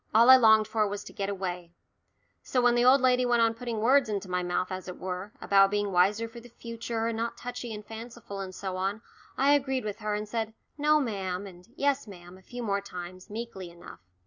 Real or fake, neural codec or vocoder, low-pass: real; none; 7.2 kHz